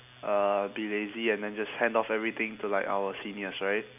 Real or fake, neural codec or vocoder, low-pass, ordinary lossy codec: real; none; 3.6 kHz; none